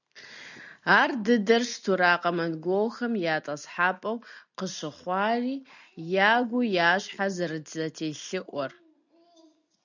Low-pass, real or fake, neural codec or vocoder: 7.2 kHz; real; none